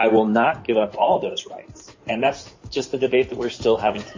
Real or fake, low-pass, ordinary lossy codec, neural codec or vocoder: fake; 7.2 kHz; MP3, 32 kbps; codec, 16 kHz in and 24 kHz out, 2.2 kbps, FireRedTTS-2 codec